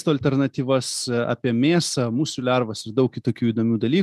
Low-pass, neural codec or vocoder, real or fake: 14.4 kHz; none; real